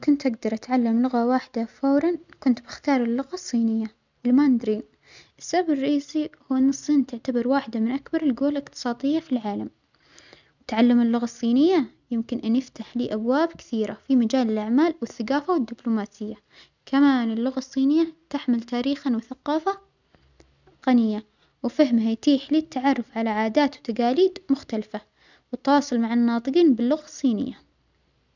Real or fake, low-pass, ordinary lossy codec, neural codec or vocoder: real; 7.2 kHz; none; none